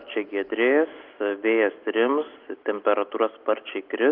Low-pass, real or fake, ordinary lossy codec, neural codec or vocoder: 5.4 kHz; real; Opus, 32 kbps; none